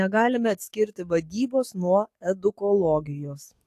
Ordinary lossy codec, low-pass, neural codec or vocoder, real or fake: AAC, 64 kbps; 14.4 kHz; codec, 44.1 kHz, 7.8 kbps, DAC; fake